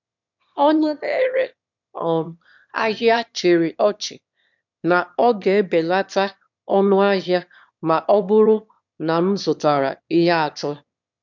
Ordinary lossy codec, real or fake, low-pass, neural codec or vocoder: none; fake; 7.2 kHz; autoencoder, 22.05 kHz, a latent of 192 numbers a frame, VITS, trained on one speaker